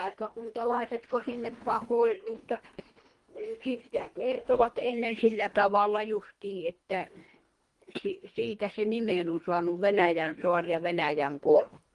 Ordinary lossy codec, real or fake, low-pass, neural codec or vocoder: Opus, 24 kbps; fake; 10.8 kHz; codec, 24 kHz, 1.5 kbps, HILCodec